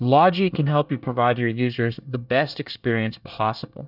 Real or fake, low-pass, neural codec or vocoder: fake; 5.4 kHz; codec, 24 kHz, 1 kbps, SNAC